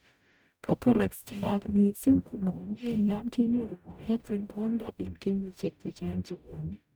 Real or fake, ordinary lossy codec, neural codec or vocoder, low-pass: fake; none; codec, 44.1 kHz, 0.9 kbps, DAC; none